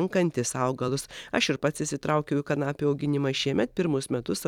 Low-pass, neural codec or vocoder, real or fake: 19.8 kHz; vocoder, 44.1 kHz, 128 mel bands every 256 samples, BigVGAN v2; fake